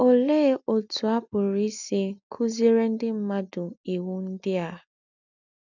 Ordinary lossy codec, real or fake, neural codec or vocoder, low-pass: none; real; none; 7.2 kHz